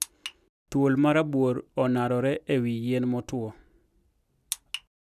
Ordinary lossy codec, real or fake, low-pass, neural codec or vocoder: none; real; 14.4 kHz; none